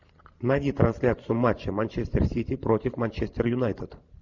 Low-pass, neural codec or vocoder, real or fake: 7.2 kHz; vocoder, 44.1 kHz, 128 mel bands every 512 samples, BigVGAN v2; fake